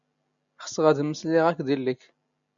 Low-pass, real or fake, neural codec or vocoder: 7.2 kHz; real; none